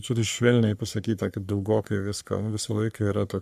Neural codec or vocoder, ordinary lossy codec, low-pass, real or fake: codec, 44.1 kHz, 3.4 kbps, Pupu-Codec; AAC, 96 kbps; 14.4 kHz; fake